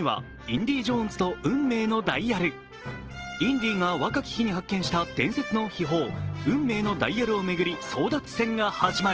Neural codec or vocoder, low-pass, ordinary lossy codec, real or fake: none; 7.2 kHz; Opus, 16 kbps; real